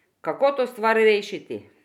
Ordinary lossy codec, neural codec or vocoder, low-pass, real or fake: none; none; 19.8 kHz; real